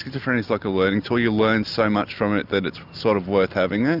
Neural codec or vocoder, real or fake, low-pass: none; real; 5.4 kHz